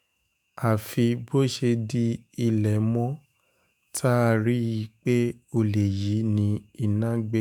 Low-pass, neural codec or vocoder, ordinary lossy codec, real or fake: none; autoencoder, 48 kHz, 128 numbers a frame, DAC-VAE, trained on Japanese speech; none; fake